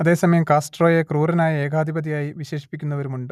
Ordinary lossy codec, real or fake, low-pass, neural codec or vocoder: none; real; 14.4 kHz; none